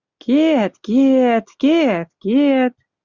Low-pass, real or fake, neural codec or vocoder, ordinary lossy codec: 7.2 kHz; real; none; Opus, 64 kbps